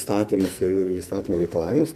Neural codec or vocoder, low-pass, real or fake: codec, 44.1 kHz, 2.6 kbps, SNAC; 14.4 kHz; fake